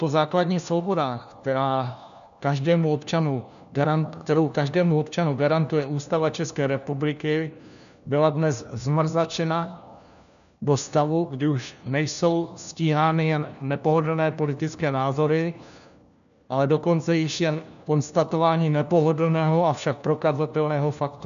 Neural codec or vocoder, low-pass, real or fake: codec, 16 kHz, 1 kbps, FunCodec, trained on LibriTTS, 50 frames a second; 7.2 kHz; fake